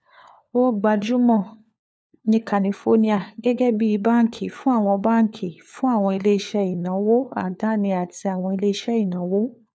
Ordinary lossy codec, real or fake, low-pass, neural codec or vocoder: none; fake; none; codec, 16 kHz, 4 kbps, FunCodec, trained on LibriTTS, 50 frames a second